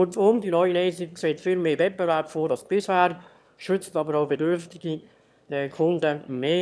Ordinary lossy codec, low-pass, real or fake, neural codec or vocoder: none; none; fake; autoencoder, 22.05 kHz, a latent of 192 numbers a frame, VITS, trained on one speaker